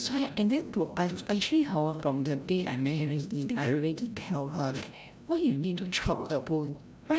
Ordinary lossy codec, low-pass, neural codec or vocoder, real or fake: none; none; codec, 16 kHz, 0.5 kbps, FreqCodec, larger model; fake